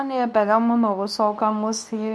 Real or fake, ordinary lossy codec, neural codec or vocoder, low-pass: fake; none; codec, 24 kHz, 0.9 kbps, WavTokenizer, medium speech release version 2; none